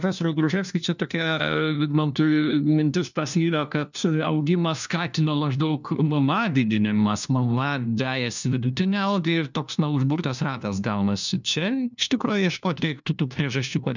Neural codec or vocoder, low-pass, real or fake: codec, 16 kHz, 1 kbps, FunCodec, trained on LibriTTS, 50 frames a second; 7.2 kHz; fake